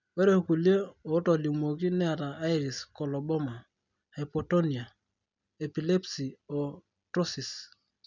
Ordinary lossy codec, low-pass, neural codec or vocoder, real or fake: none; 7.2 kHz; none; real